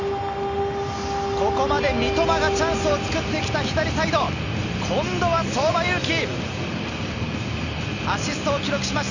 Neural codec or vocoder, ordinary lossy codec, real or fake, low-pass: none; none; real; 7.2 kHz